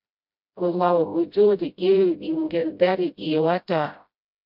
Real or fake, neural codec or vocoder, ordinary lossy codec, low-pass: fake; codec, 16 kHz, 0.5 kbps, FreqCodec, smaller model; MP3, 48 kbps; 5.4 kHz